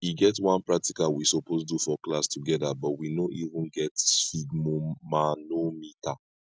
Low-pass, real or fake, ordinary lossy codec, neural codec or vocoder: none; real; none; none